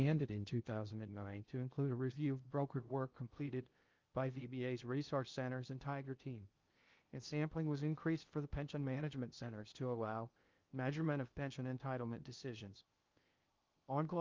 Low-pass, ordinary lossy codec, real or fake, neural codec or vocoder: 7.2 kHz; Opus, 24 kbps; fake; codec, 16 kHz in and 24 kHz out, 0.6 kbps, FocalCodec, streaming, 2048 codes